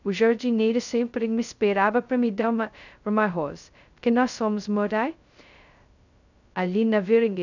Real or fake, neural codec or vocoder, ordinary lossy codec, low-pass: fake; codec, 16 kHz, 0.2 kbps, FocalCodec; none; 7.2 kHz